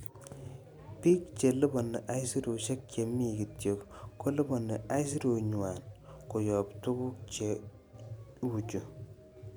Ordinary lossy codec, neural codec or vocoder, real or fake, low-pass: none; none; real; none